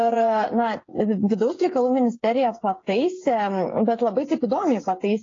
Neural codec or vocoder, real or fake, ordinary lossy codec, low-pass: codec, 16 kHz, 8 kbps, FreqCodec, smaller model; fake; AAC, 32 kbps; 7.2 kHz